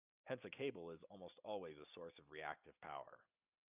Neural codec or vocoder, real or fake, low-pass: none; real; 3.6 kHz